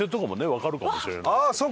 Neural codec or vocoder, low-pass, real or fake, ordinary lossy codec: none; none; real; none